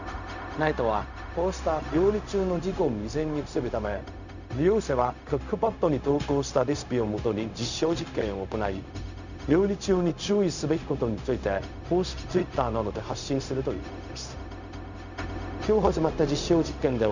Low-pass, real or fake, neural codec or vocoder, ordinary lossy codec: 7.2 kHz; fake; codec, 16 kHz, 0.4 kbps, LongCat-Audio-Codec; none